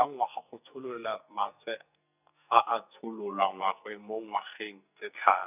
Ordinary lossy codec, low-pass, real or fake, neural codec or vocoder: none; 3.6 kHz; fake; codec, 44.1 kHz, 2.6 kbps, SNAC